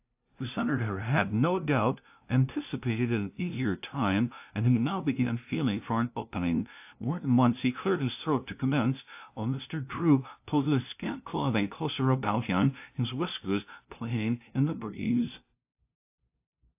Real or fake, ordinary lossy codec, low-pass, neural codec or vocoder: fake; AAC, 32 kbps; 3.6 kHz; codec, 16 kHz, 0.5 kbps, FunCodec, trained on LibriTTS, 25 frames a second